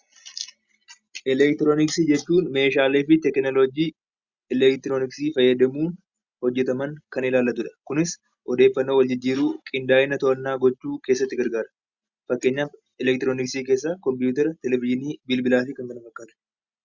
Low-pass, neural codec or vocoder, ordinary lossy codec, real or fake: 7.2 kHz; none; Opus, 64 kbps; real